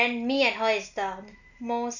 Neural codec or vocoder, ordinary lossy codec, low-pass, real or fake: none; none; 7.2 kHz; real